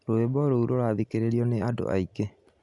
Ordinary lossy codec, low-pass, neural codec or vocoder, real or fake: none; 10.8 kHz; none; real